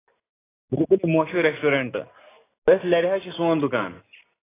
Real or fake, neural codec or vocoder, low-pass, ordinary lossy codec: real; none; 3.6 kHz; AAC, 16 kbps